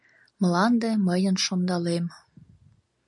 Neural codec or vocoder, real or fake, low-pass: none; real; 10.8 kHz